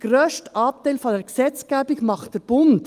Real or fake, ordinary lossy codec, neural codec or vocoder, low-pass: real; Opus, 32 kbps; none; 14.4 kHz